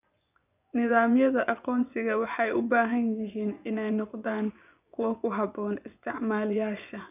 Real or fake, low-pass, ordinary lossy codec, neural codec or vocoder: fake; 3.6 kHz; none; vocoder, 24 kHz, 100 mel bands, Vocos